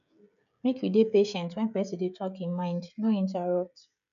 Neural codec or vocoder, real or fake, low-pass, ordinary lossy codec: codec, 16 kHz, 16 kbps, FreqCodec, smaller model; fake; 7.2 kHz; none